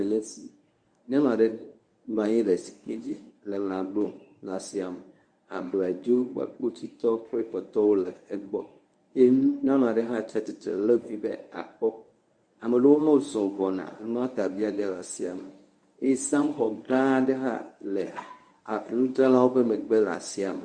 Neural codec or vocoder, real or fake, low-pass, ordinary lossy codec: codec, 24 kHz, 0.9 kbps, WavTokenizer, medium speech release version 1; fake; 9.9 kHz; Opus, 64 kbps